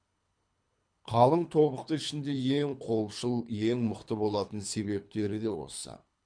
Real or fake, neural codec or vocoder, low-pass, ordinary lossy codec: fake; codec, 24 kHz, 3 kbps, HILCodec; 9.9 kHz; MP3, 64 kbps